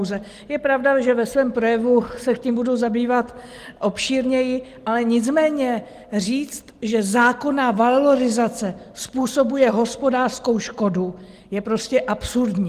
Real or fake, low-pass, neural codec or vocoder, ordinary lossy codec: real; 14.4 kHz; none; Opus, 24 kbps